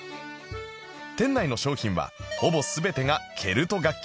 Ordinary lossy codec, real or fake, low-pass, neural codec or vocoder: none; real; none; none